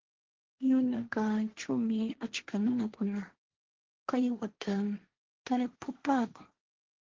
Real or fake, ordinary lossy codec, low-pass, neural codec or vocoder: fake; Opus, 16 kbps; 7.2 kHz; codec, 16 kHz, 1.1 kbps, Voila-Tokenizer